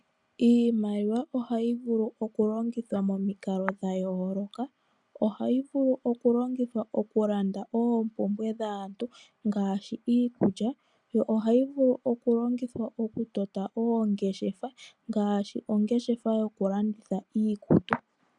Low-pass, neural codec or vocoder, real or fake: 10.8 kHz; none; real